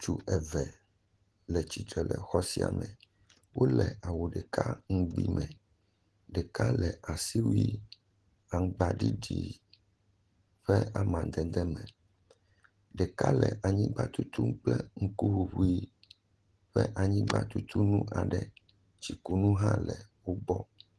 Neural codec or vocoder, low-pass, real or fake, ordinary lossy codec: none; 10.8 kHz; real; Opus, 16 kbps